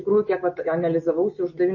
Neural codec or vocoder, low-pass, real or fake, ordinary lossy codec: none; 7.2 kHz; real; MP3, 32 kbps